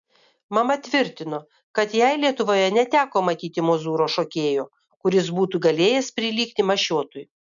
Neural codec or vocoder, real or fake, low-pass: none; real; 7.2 kHz